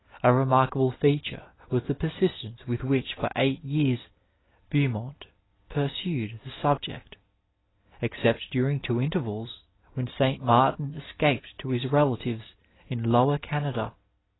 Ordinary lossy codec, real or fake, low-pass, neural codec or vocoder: AAC, 16 kbps; real; 7.2 kHz; none